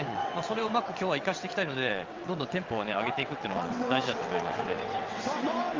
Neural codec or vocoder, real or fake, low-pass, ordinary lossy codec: vocoder, 22.05 kHz, 80 mel bands, WaveNeXt; fake; 7.2 kHz; Opus, 32 kbps